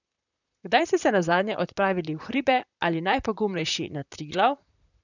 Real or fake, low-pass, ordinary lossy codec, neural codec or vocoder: fake; 7.2 kHz; none; vocoder, 44.1 kHz, 128 mel bands, Pupu-Vocoder